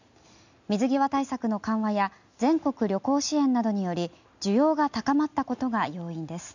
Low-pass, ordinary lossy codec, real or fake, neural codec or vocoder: 7.2 kHz; none; real; none